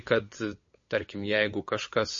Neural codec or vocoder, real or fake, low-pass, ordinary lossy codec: codec, 16 kHz, 8 kbps, FunCodec, trained on LibriTTS, 25 frames a second; fake; 7.2 kHz; MP3, 32 kbps